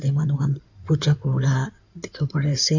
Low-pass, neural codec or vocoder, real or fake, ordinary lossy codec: 7.2 kHz; codec, 16 kHz in and 24 kHz out, 2.2 kbps, FireRedTTS-2 codec; fake; none